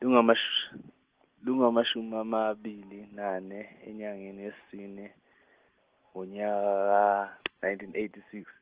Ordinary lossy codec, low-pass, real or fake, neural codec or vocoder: Opus, 16 kbps; 3.6 kHz; real; none